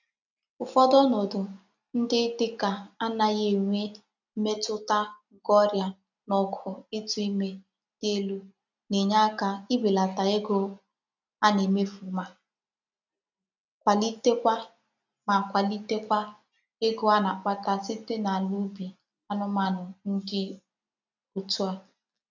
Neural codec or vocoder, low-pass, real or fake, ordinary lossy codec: none; 7.2 kHz; real; none